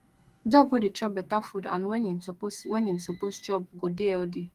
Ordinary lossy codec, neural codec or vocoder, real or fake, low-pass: Opus, 32 kbps; codec, 44.1 kHz, 2.6 kbps, SNAC; fake; 14.4 kHz